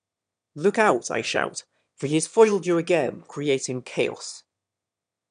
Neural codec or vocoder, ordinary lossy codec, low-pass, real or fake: autoencoder, 22.05 kHz, a latent of 192 numbers a frame, VITS, trained on one speaker; none; 9.9 kHz; fake